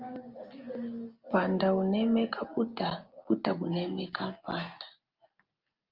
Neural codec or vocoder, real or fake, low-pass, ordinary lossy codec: none; real; 5.4 kHz; Opus, 32 kbps